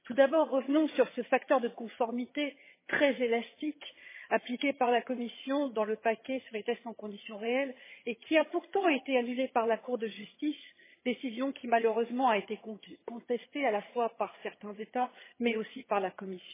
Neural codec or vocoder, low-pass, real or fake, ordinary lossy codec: vocoder, 22.05 kHz, 80 mel bands, HiFi-GAN; 3.6 kHz; fake; MP3, 16 kbps